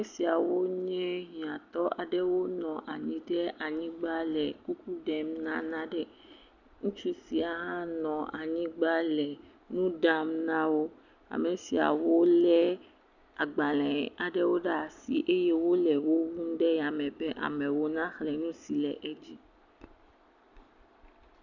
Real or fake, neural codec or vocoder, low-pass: real; none; 7.2 kHz